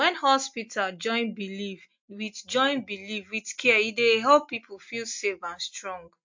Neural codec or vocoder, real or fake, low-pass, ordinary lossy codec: none; real; 7.2 kHz; MP3, 48 kbps